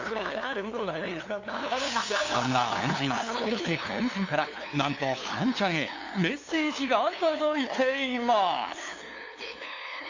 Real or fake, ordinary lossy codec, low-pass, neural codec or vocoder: fake; AAC, 48 kbps; 7.2 kHz; codec, 16 kHz, 2 kbps, FunCodec, trained on LibriTTS, 25 frames a second